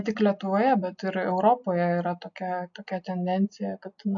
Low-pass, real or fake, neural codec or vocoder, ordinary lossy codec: 7.2 kHz; real; none; AAC, 64 kbps